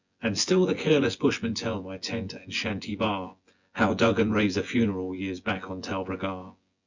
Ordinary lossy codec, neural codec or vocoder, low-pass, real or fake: Opus, 64 kbps; vocoder, 24 kHz, 100 mel bands, Vocos; 7.2 kHz; fake